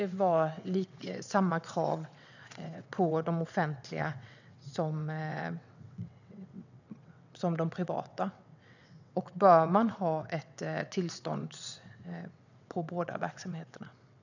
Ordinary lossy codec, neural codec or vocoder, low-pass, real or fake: none; vocoder, 22.05 kHz, 80 mel bands, WaveNeXt; 7.2 kHz; fake